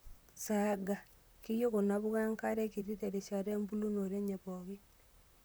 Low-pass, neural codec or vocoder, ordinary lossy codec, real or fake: none; vocoder, 44.1 kHz, 128 mel bands, Pupu-Vocoder; none; fake